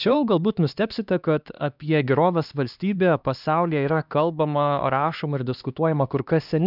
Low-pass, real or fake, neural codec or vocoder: 5.4 kHz; fake; codec, 16 kHz, 2 kbps, X-Codec, HuBERT features, trained on LibriSpeech